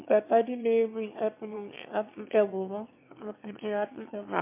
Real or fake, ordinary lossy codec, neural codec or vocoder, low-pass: fake; MP3, 24 kbps; autoencoder, 22.05 kHz, a latent of 192 numbers a frame, VITS, trained on one speaker; 3.6 kHz